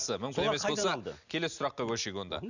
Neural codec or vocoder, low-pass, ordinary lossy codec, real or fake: none; 7.2 kHz; none; real